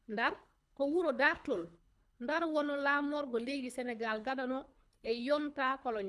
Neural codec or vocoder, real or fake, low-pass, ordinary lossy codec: codec, 24 kHz, 3 kbps, HILCodec; fake; none; none